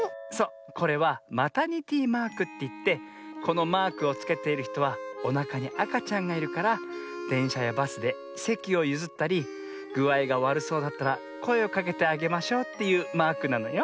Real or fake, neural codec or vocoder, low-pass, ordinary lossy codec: real; none; none; none